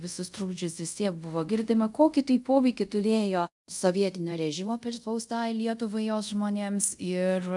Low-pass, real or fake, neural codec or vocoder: 10.8 kHz; fake; codec, 24 kHz, 0.5 kbps, DualCodec